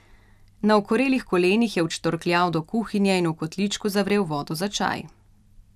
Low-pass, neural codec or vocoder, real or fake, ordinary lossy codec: 14.4 kHz; vocoder, 44.1 kHz, 128 mel bands every 256 samples, BigVGAN v2; fake; none